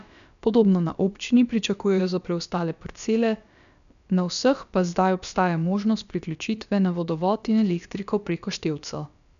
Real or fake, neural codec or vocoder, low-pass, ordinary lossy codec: fake; codec, 16 kHz, about 1 kbps, DyCAST, with the encoder's durations; 7.2 kHz; none